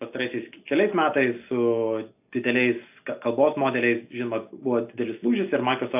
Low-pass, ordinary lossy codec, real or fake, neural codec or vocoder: 3.6 kHz; AAC, 32 kbps; real; none